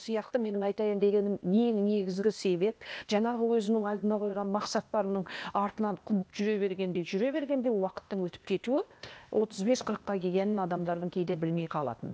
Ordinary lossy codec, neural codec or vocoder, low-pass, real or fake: none; codec, 16 kHz, 0.8 kbps, ZipCodec; none; fake